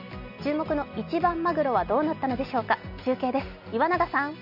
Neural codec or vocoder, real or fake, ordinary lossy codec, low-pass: none; real; none; 5.4 kHz